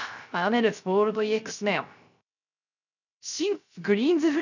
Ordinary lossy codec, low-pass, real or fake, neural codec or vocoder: none; 7.2 kHz; fake; codec, 16 kHz, 0.3 kbps, FocalCodec